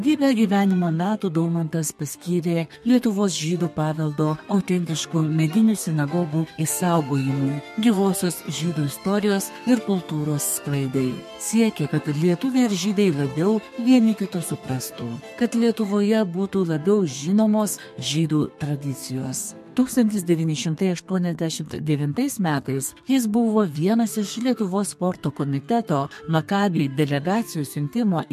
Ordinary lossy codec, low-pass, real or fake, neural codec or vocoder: MP3, 64 kbps; 14.4 kHz; fake; codec, 32 kHz, 1.9 kbps, SNAC